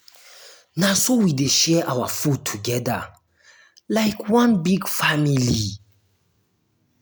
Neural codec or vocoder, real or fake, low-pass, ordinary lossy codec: none; real; none; none